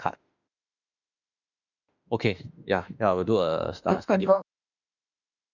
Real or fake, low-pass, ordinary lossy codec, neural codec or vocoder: fake; 7.2 kHz; none; autoencoder, 48 kHz, 32 numbers a frame, DAC-VAE, trained on Japanese speech